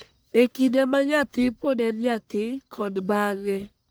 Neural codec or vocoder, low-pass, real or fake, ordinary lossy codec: codec, 44.1 kHz, 1.7 kbps, Pupu-Codec; none; fake; none